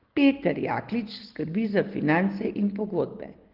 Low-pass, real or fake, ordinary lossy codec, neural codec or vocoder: 5.4 kHz; real; Opus, 16 kbps; none